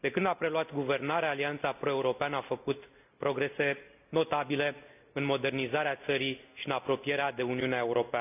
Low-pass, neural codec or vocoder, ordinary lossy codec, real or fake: 3.6 kHz; none; none; real